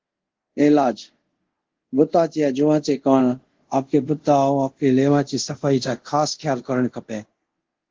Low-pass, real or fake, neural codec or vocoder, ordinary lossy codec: 7.2 kHz; fake; codec, 24 kHz, 0.5 kbps, DualCodec; Opus, 16 kbps